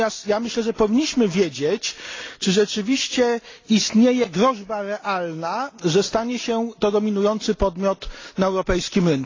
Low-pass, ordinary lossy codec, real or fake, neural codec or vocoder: 7.2 kHz; AAC, 32 kbps; real; none